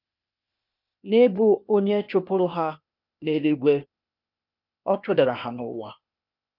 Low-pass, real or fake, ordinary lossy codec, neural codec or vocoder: 5.4 kHz; fake; none; codec, 16 kHz, 0.8 kbps, ZipCodec